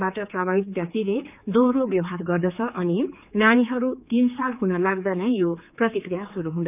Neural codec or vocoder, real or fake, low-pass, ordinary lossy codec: codec, 16 kHz, 4 kbps, X-Codec, HuBERT features, trained on general audio; fake; 3.6 kHz; none